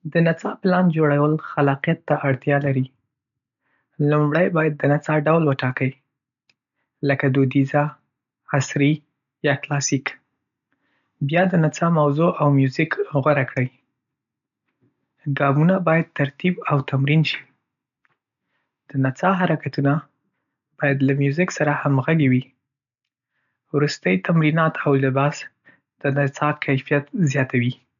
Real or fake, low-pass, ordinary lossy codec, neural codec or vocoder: real; 7.2 kHz; none; none